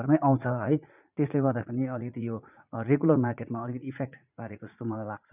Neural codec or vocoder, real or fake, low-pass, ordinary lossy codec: vocoder, 44.1 kHz, 80 mel bands, Vocos; fake; 3.6 kHz; none